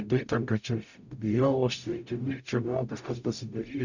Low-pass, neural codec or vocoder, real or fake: 7.2 kHz; codec, 44.1 kHz, 0.9 kbps, DAC; fake